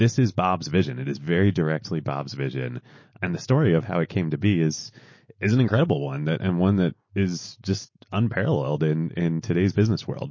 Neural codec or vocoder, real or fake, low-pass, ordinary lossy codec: none; real; 7.2 kHz; MP3, 32 kbps